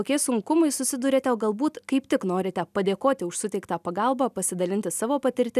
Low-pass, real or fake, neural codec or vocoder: 14.4 kHz; real; none